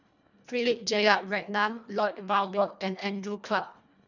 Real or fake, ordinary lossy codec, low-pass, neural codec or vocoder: fake; none; 7.2 kHz; codec, 24 kHz, 1.5 kbps, HILCodec